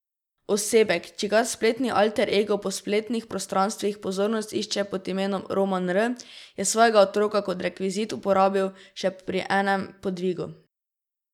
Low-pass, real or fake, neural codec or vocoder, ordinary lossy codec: 19.8 kHz; real; none; none